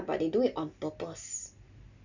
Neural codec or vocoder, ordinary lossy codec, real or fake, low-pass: none; none; real; 7.2 kHz